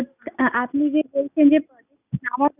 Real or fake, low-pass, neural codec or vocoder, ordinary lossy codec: real; 3.6 kHz; none; none